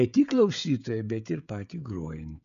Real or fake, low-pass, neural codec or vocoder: fake; 7.2 kHz; codec, 16 kHz, 16 kbps, FreqCodec, smaller model